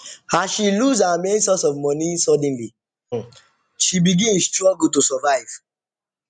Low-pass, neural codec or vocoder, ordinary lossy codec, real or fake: 9.9 kHz; none; none; real